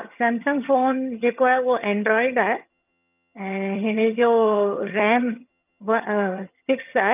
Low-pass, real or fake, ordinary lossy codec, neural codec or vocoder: 3.6 kHz; fake; none; vocoder, 22.05 kHz, 80 mel bands, HiFi-GAN